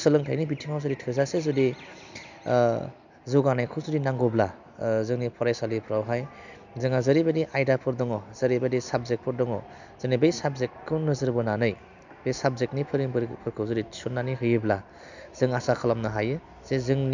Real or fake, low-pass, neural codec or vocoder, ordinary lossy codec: real; 7.2 kHz; none; none